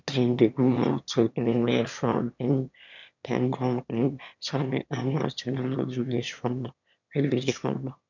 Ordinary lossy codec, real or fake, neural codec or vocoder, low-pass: none; fake; autoencoder, 22.05 kHz, a latent of 192 numbers a frame, VITS, trained on one speaker; 7.2 kHz